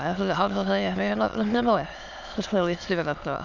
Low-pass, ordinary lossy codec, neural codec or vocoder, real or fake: 7.2 kHz; none; autoencoder, 22.05 kHz, a latent of 192 numbers a frame, VITS, trained on many speakers; fake